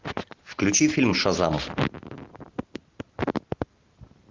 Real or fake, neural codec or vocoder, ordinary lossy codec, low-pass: real; none; Opus, 32 kbps; 7.2 kHz